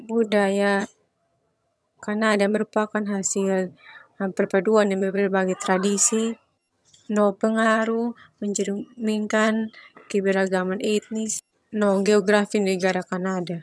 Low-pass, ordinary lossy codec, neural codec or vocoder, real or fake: none; none; vocoder, 22.05 kHz, 80 mel bands, HiFi-GAN; fake